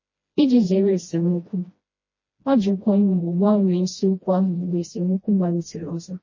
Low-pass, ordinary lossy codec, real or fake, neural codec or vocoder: 7.2 kHz; MP3, 32 kbps; fake; codec, 16 kHz, 1 kbps, FreqCodec, smaller model